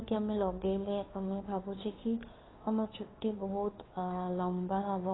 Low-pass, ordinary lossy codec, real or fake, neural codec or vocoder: 7.2 kHz; AAC, 16 kbps; fake; codec, 16 kHz, 2 kbps, FunCodec, trained on Chinese and English, 25 frames a second